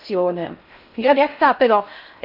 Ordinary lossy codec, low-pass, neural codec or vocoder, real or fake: none; 5.4 kHz; codec, 16 kHz in and 24 kHz out, 0.6 kbps, FocalCodec, streaming, 4096 codes; fake